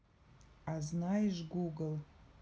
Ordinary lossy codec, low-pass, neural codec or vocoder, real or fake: none; none; none; real